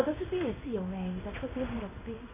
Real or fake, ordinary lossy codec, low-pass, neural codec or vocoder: fake; MP3, 16 kbps; 3.6 kHz; codec, 16 kHz in and 24 kHz out, 1 kbps, XY-Tokenizer